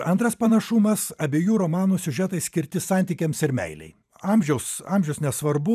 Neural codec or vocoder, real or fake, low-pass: vocoder, 44.1 kHz, 128 mel bands every 512 samples, BigVGAN v2; fake; 14.4 kHz